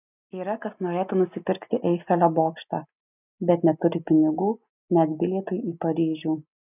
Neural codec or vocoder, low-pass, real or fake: none; 3.6 kHz; real